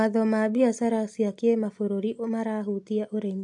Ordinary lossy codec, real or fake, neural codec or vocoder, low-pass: AAC, 64 kbps; real; none; 10.8 kHz